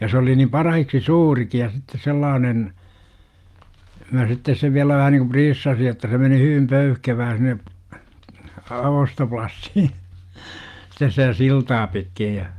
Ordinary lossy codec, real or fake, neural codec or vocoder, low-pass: Opus, 32 kbps; real; none; 14.4 kHz